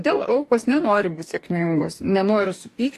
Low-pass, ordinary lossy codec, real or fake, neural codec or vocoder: 14.4 kHz; AAC, 64 kbps; fake; codec, 44.1 kHz, 2.6 kbps, DAC